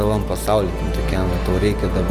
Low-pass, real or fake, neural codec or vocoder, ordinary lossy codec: 14.4 kHz; real; none; Opus, 32 kbps